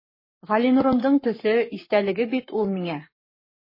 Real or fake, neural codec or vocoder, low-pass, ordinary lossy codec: fake; codec, 44.1 kHz, 7.8 kbps, Pupu-Codec; 5.4 kHz; MP3, 24 kbps